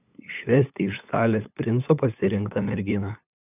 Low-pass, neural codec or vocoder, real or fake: 3.6 kHz; codec, 16 kHz, 8 kbps, FunCodec, trained on LibriTTS, 25 frames a second; fake